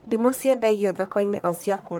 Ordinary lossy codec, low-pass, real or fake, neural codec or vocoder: none; none; fake; codec, 44.1 kHz, 1.7 kbps, Pupu-Codec